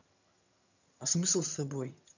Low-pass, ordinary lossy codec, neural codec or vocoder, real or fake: 7.2 kHz; none; vocoder, 22.05 kHz, 80 mel bands, HiFi-GAN; fake